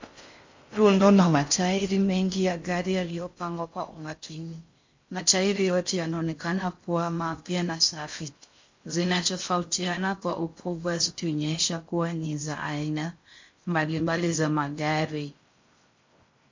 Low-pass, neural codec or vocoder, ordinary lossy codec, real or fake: 7.2 kHz; codec, 16 kHz in and 24 kHz out, 0.6 kbps, FocalCodec, streaming, 4096 codes; MP3, 48 kbps; fake